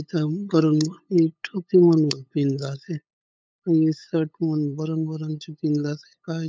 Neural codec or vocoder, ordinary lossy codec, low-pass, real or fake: codec, 16 kHz, 8 kbps, FunCodec, trained on LibriTTS, 25 frames a second; none; none; fake